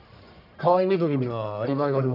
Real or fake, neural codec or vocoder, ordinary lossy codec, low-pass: fake; codec, 44.1 kHz, 1.7 kbps, Pupu-Codec; none; 5.4 kHz